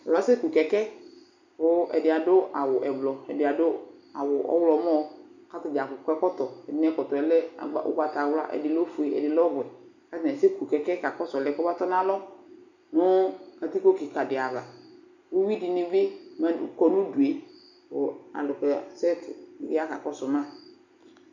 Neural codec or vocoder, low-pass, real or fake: none; 7.2 kHz; real